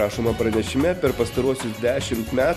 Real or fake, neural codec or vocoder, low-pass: fake; vocoder, 44.1 kHz, 128 mel bands every 256 samples, BigVGAN v2; 14.4 kHz